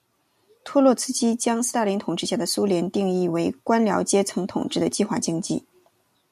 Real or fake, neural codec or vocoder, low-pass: real; none; 14.4 kHz